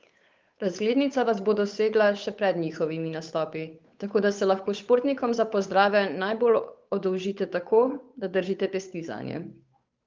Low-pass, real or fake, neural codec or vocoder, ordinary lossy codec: 7.2 kHz; fake; codec, 16 kHz, 8 kbps, FunCodec, trained on Chinese and English, 25 frames a second; Opus, 24 kbps